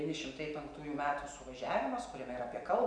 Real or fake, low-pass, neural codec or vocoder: real; 9.9 kHz; none